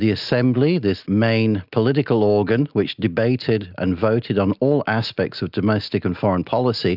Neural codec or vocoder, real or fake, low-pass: none; real; 5.4 kHz